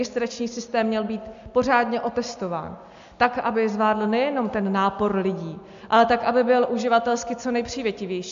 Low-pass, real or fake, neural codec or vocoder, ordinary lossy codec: 7.2 kHz; real; none; AAC, 64 kbps